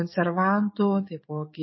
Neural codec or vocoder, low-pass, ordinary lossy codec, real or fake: none; 7.2 kHz; MP3, 24 kbps; real